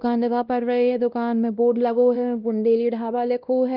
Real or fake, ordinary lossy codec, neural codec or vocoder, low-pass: fake; Opus, 32 kbps; codec, 16 kHz, 1 kbps, X-Codec, WavLM features, trained on Multilingual LibriSpeech; 5.4 kHz